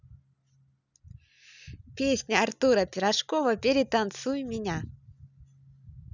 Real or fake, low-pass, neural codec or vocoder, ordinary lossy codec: real; 7.2 kHz; none; none